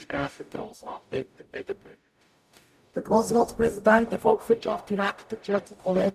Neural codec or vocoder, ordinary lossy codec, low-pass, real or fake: codec, 44.1 kHz, 0.9 kbps, DAC; none; 14.4 kHz; fake